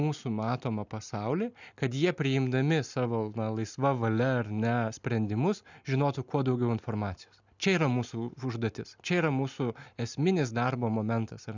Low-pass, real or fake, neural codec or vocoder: 7.2 kHz; real; none